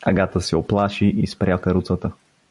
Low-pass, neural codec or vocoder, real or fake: 10.8 kHz; none; real